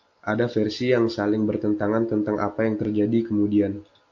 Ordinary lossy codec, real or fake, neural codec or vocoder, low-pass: AAC, 48 kbps; real; none; 7.2 kHz